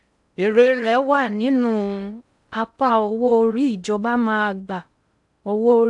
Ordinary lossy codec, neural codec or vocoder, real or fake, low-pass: none; codec, 16 kHz in and 24 kHz out, 0.6 kbps, FocalCodec, streaming, 4096 codes; fake; 10.8 kHz